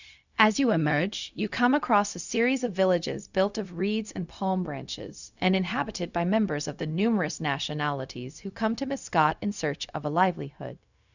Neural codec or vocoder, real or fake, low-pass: codec, 16 kHz, 0.4 kbps, LongCat-Audio-Codec; fake; 7.2 kHz